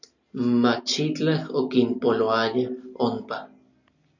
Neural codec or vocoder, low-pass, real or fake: none; 7.2 kHz; real